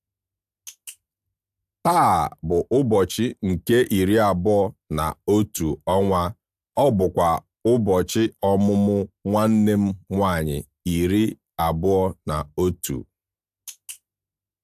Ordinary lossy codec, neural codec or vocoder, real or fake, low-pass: AAC, 96 kbps; none; real; 14.4 kHz